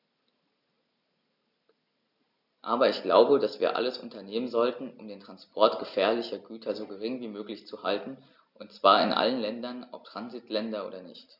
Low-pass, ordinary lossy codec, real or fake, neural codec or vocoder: 5.4 kHz; none; real; none